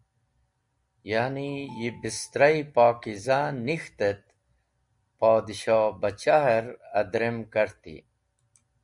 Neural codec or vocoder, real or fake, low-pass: none; real; 10.8 kHz